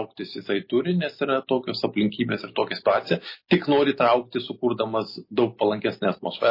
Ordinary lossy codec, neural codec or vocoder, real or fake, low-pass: MP3, 24 kbps; none; real; 5.4 kHz